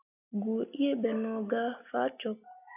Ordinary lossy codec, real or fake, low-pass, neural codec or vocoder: AAC, 32 kbps; real; 3.6 kHz; none